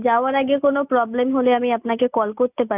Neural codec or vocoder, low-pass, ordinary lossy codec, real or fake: none; 3.6 kHz; none; real